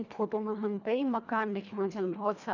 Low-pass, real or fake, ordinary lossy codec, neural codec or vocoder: 7.2 kHz; fake; none; codec, 24 kHz, 1.5 kbps, HILCodec